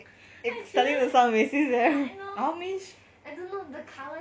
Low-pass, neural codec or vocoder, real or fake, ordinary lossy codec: none; none; real; none